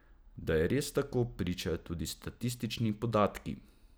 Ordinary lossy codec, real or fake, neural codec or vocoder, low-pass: none; real; none; none